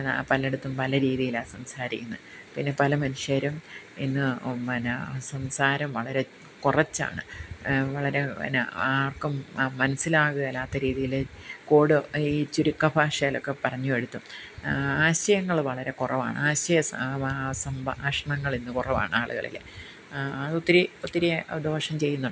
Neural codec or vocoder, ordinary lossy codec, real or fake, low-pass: none; none; real; none